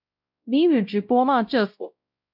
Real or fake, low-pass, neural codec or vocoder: fake; 5.4 kHz; codec, 16 kHz, 0.5 kbps, X-Codec, WavLM features, trained on Multilingual LibriSpeech